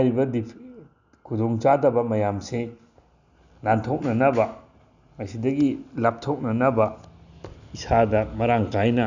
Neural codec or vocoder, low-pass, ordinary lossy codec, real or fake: none; 7.2 kHz; none; real